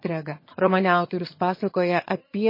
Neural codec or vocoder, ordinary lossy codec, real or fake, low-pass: vocoder, 22.05 kHz, 80 mel bands, HiFi-GAN; MP3, 24 kbps; fake; 5.4 kHz